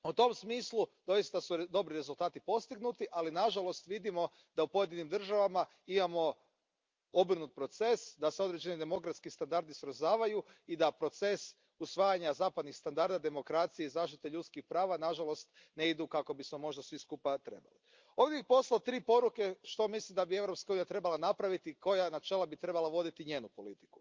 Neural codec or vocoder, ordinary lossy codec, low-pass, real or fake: none; Opus, 24 kbps; 7.2 kHz; real